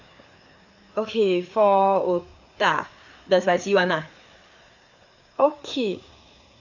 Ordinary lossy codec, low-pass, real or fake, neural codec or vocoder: none; 7.2 kHz; fake; codec, 16 kHz, 4 kbps, FreqCodec, larger model